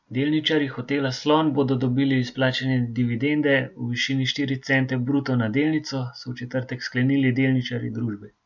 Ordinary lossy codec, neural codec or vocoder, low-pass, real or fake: none; none; 7.2 kHz; real